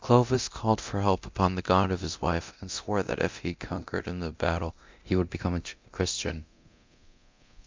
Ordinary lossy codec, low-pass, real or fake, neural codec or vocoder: MP3, 64 kbps; 7.2 kHz; fake; codec, 24 kHz, 0.9 kbps, DualCodec